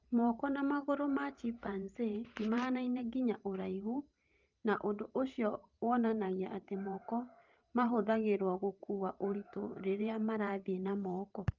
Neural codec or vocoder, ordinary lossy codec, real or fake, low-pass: vocoder, 44.1 kHz, 128 mel bands every 512 samples, BigVGAN v2; Opus, 24 kbps; fake; 7.2 kHz